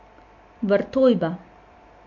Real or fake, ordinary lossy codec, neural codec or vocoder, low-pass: real; MP3, 48 kbps; none; 7.2 kHz